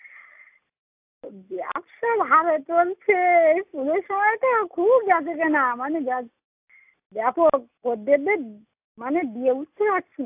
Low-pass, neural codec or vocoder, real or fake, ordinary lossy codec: 3.6 kHz; none; real; AAC, 32 kbps